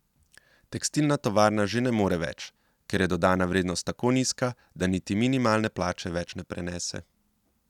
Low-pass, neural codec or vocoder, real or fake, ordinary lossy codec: 19.8 kHz; none; real; none